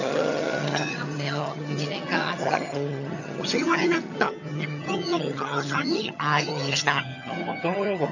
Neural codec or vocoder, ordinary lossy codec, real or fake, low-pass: vocoder, 22.05 kHz, 80 mel bands, HiFi-GAN; none; fake; 7.2 kHz